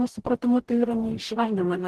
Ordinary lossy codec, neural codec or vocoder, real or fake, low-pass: Opus, 16 kbps; codec, 44.1 kHz, 0.9 kbps, DAC; fake; 14.4 kHz